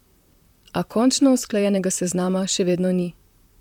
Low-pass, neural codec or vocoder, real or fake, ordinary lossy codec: 19.8 kHz; vocoder, 44.1 kHz, 128 mel bands, Pupu-Vocoder; fake; MP3, 96 kbps